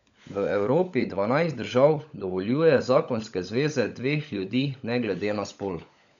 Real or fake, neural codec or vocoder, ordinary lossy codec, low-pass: fake; codec, 16 kHz, 16 kbps, FunCodec, trained on LibriTTS, 50 frames a second; none; 7.2 kHz